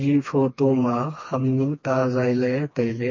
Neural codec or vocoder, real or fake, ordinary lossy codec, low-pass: codec, 16 kHz, 2 kbps, FreqCodec, smaller model; fake; MP3, 32 kbps; 7.2 kHz